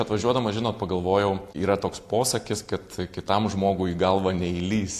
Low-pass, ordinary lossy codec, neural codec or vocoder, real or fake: 14.4 kHz; AAC, 64 kbps; none; real